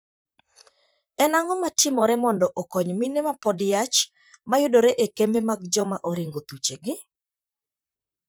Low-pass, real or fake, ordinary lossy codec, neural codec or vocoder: none; fake; none; vocoder, 44.1 kHz, 128 mel bands, Pupu-Vocoder